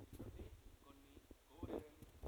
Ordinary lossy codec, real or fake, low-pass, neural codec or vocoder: none; real; none; none